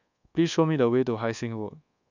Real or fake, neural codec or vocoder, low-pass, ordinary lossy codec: fake; codec, 24 kHz, 1.2 kbps, DualCodec; 7.2 kHz; none